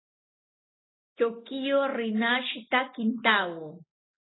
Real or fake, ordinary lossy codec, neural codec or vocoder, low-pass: real; AAC, 16 kbps; none; 7.2 kHz